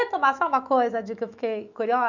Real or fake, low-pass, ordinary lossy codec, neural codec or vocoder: fake; 7.2 kHz; none; autoencoder, 48 kHz, 128 numbers a frame, DAC-VAE, trained on Japanese speech